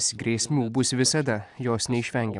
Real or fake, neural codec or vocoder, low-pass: real; none; 10.8 kHz